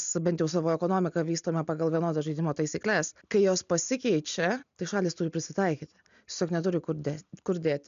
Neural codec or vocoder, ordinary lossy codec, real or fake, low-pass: none; MP3, 96 kbps; real; 7.2 kHz